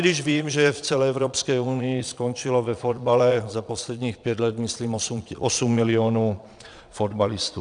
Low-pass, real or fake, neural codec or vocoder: 9.9 kHz; fake; vocoder, 22.05 kHz, 80 mel bands, Vocos